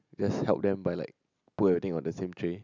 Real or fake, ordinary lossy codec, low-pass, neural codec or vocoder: real; none; 7.2 kHz; none